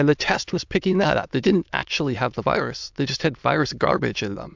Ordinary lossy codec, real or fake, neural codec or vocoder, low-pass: MP3, 64 kbps; fake; autoencoder, 22.05 kHz, a latent of 192 numbers a frame, VITS, trained on many speakers; 7.2 kHz